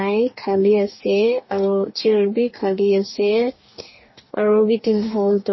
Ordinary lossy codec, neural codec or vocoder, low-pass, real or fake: MP3, 24 kbps; codec, 44.1 kHz, 2.6 kbps, DAC; 7.2 kHz; fake